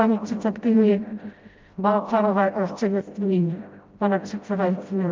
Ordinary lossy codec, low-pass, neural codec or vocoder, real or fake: Opus, 32 kbps; 7.2 kHz; codec, 16 kHz, 0.5 kbps, FreqCodec, smaller model; fake